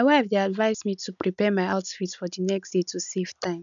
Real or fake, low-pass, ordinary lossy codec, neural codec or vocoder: real; 7.2 kHz; none; none